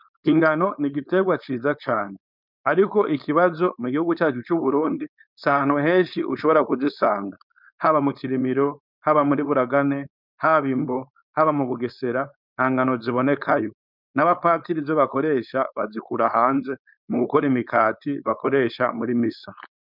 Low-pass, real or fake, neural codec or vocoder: 5.4 kHz; fake; codec, 16 kHz, 4.8 kbps, FACodec